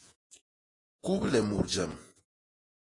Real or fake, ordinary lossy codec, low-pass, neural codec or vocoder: fake; AAC, 32 kbps; 10.8 kHz; vocoder, 48 kHz, 128 mel bands, Vocos